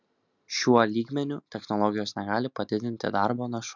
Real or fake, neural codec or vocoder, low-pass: real; none; 7.2 kHz